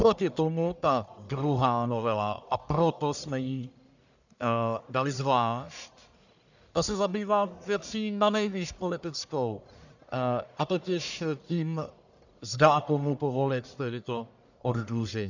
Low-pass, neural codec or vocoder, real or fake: 7.2 kHz; codec, 44.1 kHz, 1.7 kbps, Pupu-Codec; fake